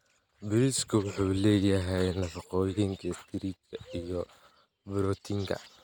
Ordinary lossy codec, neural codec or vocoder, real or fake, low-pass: none; none; real; none